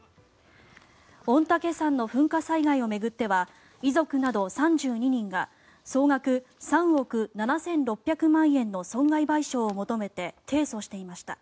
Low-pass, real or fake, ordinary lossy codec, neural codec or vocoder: none; real; none; none